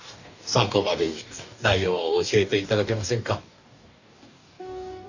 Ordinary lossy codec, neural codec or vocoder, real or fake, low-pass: none; codec, 44.1 kHz, 2.6 kbps, DAC; fake; 7.2 kHz